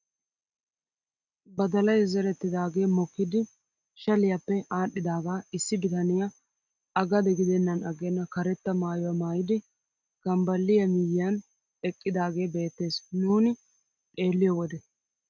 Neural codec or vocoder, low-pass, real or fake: none; 7.2 kHz; real